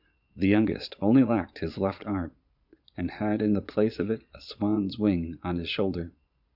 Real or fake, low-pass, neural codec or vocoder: fake; 5.4 kHz; vocoder, 22.05 kHz, 80 mel bands, Vocos